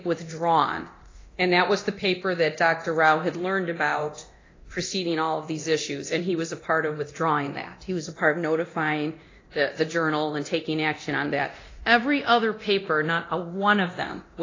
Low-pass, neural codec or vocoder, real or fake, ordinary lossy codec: 7.2 kHz; codec, 24 kHz, 0.9 kbps, DualCodec; fake; AAC, 32 kbps